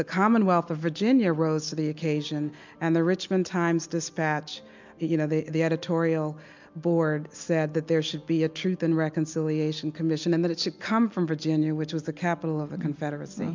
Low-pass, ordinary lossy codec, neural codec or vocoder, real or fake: 7.2 kHz; MP3, 64 kbps; none; real